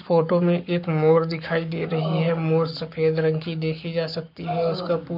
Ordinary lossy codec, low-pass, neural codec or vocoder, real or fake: none; 5.4 kHz; codec, 44.1 kHz, 7.8 kbps, Pupu-Codec; fake